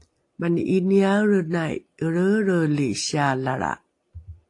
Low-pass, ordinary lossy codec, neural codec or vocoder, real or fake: 10.8 kHz; AAC, 48 kbps; none; real